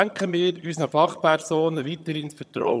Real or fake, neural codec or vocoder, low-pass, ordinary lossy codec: fake; vocoder, 22.05 kHz, 80 mel bands, HiFi-GAN; none; none